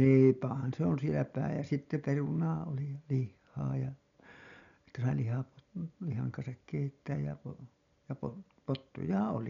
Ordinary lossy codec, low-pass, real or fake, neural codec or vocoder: none; 7.2 kHz; real; none